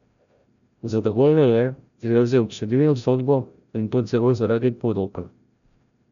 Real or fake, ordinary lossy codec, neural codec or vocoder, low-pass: fake; none; codec, 16 kHz, 0.5 kbps, FreqCodec, larger model; 7.2 kHz